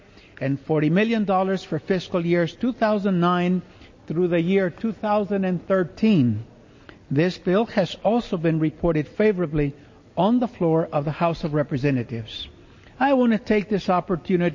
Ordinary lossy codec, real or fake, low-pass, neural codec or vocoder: MP3, 32 kbps; real; 7.2 kHz; none